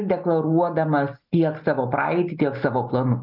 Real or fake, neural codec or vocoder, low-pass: real; none; 5.4 kHz